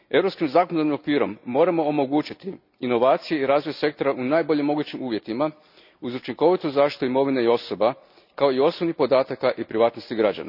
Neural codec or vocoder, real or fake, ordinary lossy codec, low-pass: none; real; none; 5.4 kHz